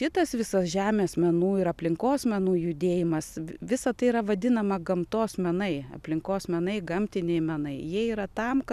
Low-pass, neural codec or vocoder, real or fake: 14.4 kHz; none; real